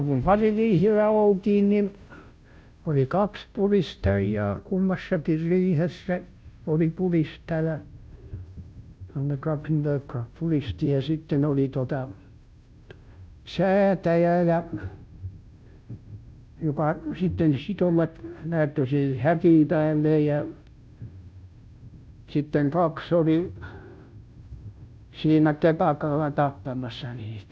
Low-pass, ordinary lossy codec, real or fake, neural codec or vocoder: none; none; fake; codec, 16 kHz, 0.5 kbps, FunCodec, trained on Chinese and English, 25 frames a second